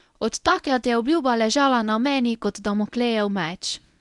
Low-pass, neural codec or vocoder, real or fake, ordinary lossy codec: 10.8 kHz; codec, 24 kHz, 0.9 kbps, WavTokenizer, medium speech release version 1; fake; none